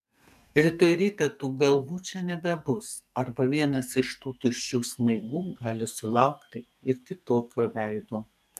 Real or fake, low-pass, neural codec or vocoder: fake; 14.4 kHz; codec, 44.1 kHz, 2.6 kbps, SNAC